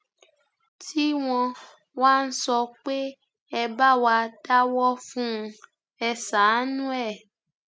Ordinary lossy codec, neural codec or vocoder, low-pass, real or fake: none; none; none; real